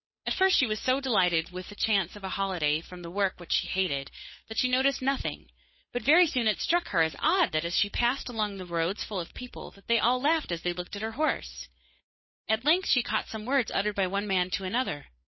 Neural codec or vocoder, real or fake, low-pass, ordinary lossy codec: codec, 16 kHz, 8 kbps, FunCodec, trained on Chinese and English, 25 frames a second; fake; 7.2 kHz; MP3, 24 kbps